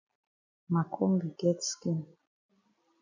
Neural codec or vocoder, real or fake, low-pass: none; real; 7.2 kHz